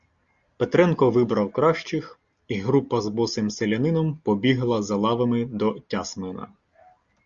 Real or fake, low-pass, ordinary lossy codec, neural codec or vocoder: real; 7.2 kHz; Opus, 64 kbps; none